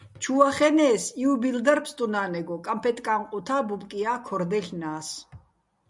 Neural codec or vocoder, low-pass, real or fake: none; 10.8 kHz; real